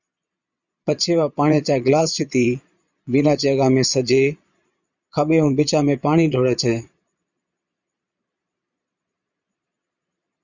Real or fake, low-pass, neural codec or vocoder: fake; 7.2 kHz; vocoder, 22.05 kHz, 80 mel bands, Vocos